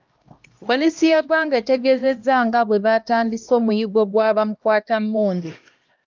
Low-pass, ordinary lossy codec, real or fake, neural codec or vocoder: 7.2 kHz; Opus, 24 kbps; fake; codec, 16 kHz, 1 kbps, X-Codec, HuBERT features, trained on LibriSpeech